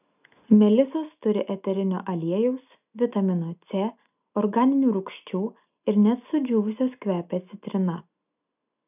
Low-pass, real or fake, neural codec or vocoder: 3.6 kHz; real; none